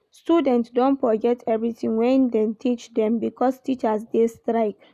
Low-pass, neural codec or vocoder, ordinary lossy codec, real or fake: 14.4 kHz; none; none; real